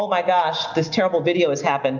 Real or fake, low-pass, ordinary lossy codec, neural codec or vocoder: real; 7.2 kHz; MP3, 64 kbps; none